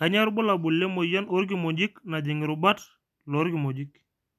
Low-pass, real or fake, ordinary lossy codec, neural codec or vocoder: 14.4 kHz; real; none; none